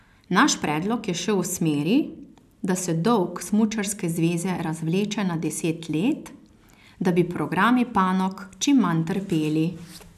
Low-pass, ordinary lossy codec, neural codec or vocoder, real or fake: 14.4 kHz; none; none; real